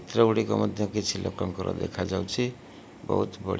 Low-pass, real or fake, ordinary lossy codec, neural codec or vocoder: none; real; none; none